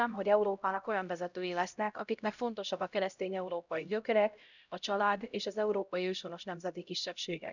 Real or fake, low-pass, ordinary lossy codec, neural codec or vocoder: fake; 7.2 kHz; none; codec, 16 kHz, 0.5 kbps, X-Codec, HuBERT features, trained on LibriSpeech